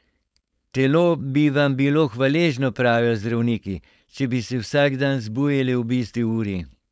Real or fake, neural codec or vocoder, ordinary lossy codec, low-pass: fake; codec, 16 kHz, 4.8 kbps, FACodec; none; none